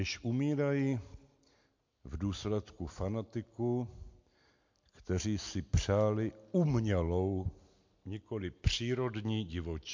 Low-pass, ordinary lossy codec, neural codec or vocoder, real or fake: 7.2 kHz; MP3, 48 kbps; none; real